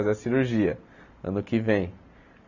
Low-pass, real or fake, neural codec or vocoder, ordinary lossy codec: 7.2 kHz; real; none; none